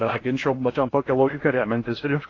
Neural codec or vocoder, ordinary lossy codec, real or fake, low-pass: codec, 16 kHz in and 24 kHz out, 0.6 kbps, FocalCodec, streaming, 2048 codes; AAC, 32 kbps; fake; 7.2 kHz